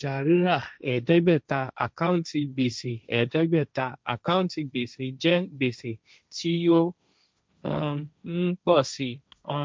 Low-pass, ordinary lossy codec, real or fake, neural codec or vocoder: none; none; fake; codec, 16 kHz, 1.1 kbps, Voila-Tokenizer